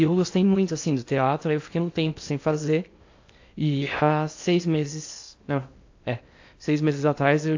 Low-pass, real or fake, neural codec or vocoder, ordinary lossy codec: 7.2 kHz; fake; codec, 16 kHz in and 24 kHz out, 0.8 kbps, FocalCodec, streaming, 65536 codes; AAC, 48 kbps